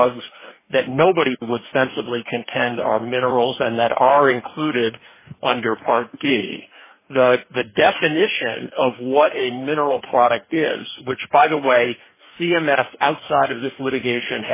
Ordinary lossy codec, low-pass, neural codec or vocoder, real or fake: MP3, 16 kbps; 3.6 kHz; codec, 44.1 kHz, 2.6 kbps, DAC; fake